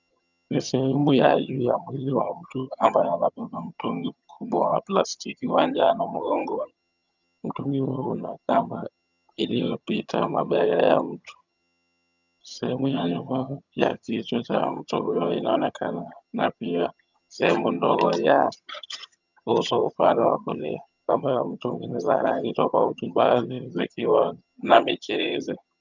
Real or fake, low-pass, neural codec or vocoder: fake; 7.2 kHz; vocoder, 22.05 kHz, 80 mel bands, HiFi-GAN